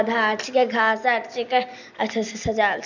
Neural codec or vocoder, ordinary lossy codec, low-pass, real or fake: none; none; 7.2 kHz; real